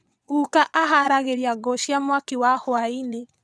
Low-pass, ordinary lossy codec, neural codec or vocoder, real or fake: none; none; vocoder, 22.05 kHz, 80 mel bands, WaveNeXt; fake